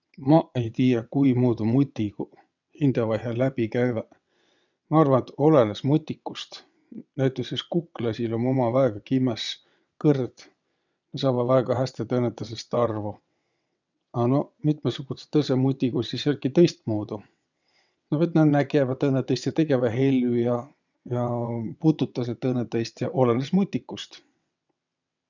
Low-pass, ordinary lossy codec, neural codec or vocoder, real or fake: 7.2 kHz; none; vocoder, 22.05 kHz, 80 mel bands, WaveNeXt; fake